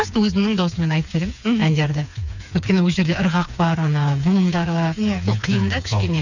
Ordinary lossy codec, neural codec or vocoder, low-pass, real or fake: none; codec, 16 kHz, 4 kbps, FreqCodec, smaller model; 7.2 kHz; fake